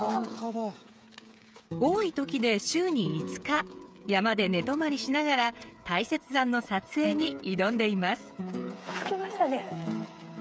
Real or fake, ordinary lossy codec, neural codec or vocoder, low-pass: fake; none; codec, 16 kHz, 8 kbps, FreqCodec, smaller model; none